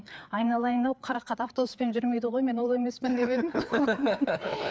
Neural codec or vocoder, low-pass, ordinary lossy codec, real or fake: codec, 16 kHz, 4 kbps, FreqCodec, larger model; none; none; fake